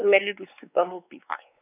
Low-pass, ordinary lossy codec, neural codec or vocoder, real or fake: 3.6 kHz; none; codec, 16 kHz, 4 kbps, X-Codec, WavLM features, trained on Multilingual LibriSpeech; fake